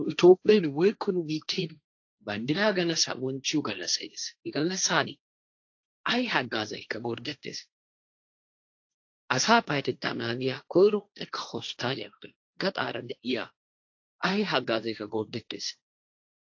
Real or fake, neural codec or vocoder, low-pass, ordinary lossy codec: fake; codec, 16 kHz, 1.1 kbps, Voila-Tokenizer; 7.2 kHz; AAC, 48 kbps